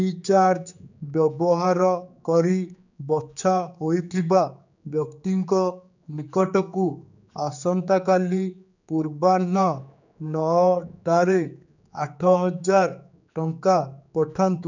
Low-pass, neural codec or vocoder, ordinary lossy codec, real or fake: 7.2 kHz; codec, 16 kHz, 4 kbps, X-Codec, HuBERT features, trained on general audio; none; fake